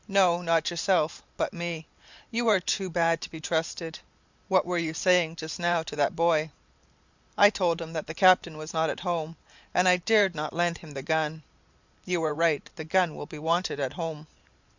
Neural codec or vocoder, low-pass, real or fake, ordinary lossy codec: vocoder, 44.1 kHz, 128 mel bands every 512 samples, BigVGAN v2; 7.2 kHz; fake; Opus, 64 kbps